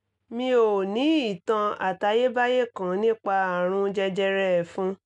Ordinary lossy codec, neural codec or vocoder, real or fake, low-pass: none; none; real; 10.8 kHz